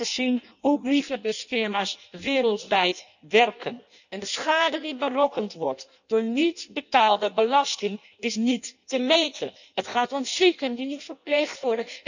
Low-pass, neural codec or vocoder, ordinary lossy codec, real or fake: 7.2 kHz; codec, 16 kHz in and 24 kHz out, 0.6 kbps, FireRedTTS-2 codec; none; fake